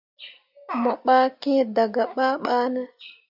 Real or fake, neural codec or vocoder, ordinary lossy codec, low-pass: real; none; Opus, 64 kbps; 5.4 kHz